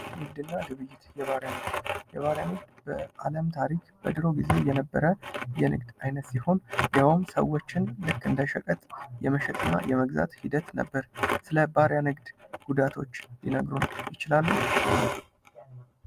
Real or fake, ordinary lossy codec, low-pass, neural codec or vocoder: real; Opus, 32 kbps; 14.4 kHz; none